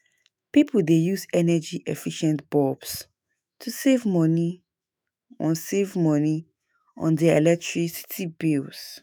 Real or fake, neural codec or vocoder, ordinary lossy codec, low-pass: fake; autoencoder, 48 kHz, 128 numbers a frame, DAC-VAE, trained on Japanese speech; none; none